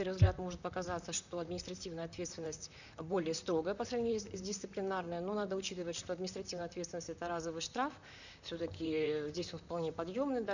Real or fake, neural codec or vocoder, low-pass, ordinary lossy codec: fake; vocoder, 44.1 kHz, 128 mel bands, Pupu-Vocoder; 7.2 kHz; none